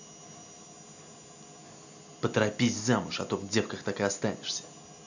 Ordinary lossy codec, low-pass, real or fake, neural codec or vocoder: none; 7.2 kHz; real; none